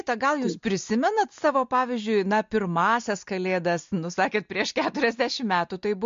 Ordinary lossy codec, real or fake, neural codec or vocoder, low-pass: MP3, 48 kbps; real; none; 7.2 kHz